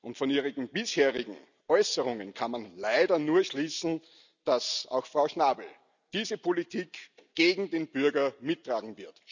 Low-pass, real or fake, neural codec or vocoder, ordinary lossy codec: 7.2 kHz; real; none; none